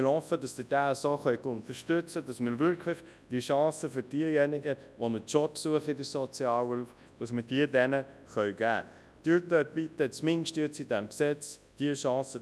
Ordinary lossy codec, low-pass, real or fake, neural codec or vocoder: none; none; fake; codec, 24 kHz, 0.9 kbps, WavTokenizer, large speech release